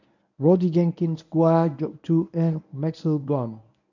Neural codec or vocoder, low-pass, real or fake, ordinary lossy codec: codec, 24 kHz, 0.9 kbps, WavTokenizer, medium speech release version 1; 7.2 kHz; fake; none